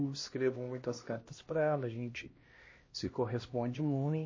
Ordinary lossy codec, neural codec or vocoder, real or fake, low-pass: MP3, 32 kbps; codec, 16 kHz, 1 kbps, X-Codec, HuBERT features, trained on LibriSpeech; fake; 7.2 kHz